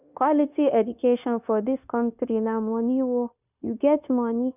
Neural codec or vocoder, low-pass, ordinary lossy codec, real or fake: codec, 16 kHz, 0.9 kbps, LongCat-Audio-Codec; 3.6 kHz; none; fake